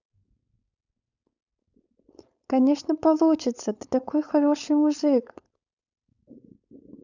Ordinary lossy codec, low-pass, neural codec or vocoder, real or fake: none; 7.2 kHz; codec, 16 kHz, 4.8 kbps, FACodec; fake